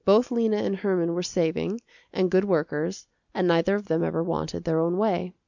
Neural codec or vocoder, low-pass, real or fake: none; 7.2 kHz; real